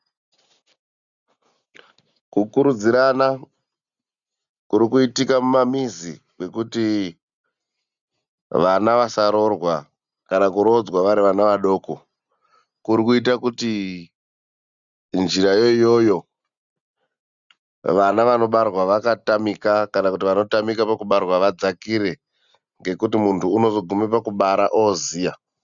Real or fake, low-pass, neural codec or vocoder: real; 7.2 kHz; none